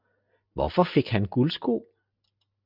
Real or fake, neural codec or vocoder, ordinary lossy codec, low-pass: real; none; MP3, 48 kbps; 5.4 kHz